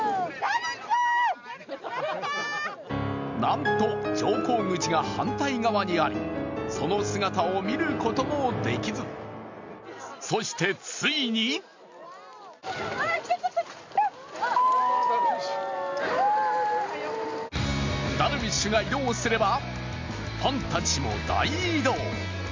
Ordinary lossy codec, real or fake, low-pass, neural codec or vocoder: MP3, 64 kbps; real; 7.2 kHz; none